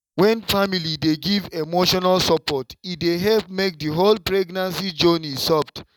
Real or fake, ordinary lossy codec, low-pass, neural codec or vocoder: real; none; 19.8 kHz; none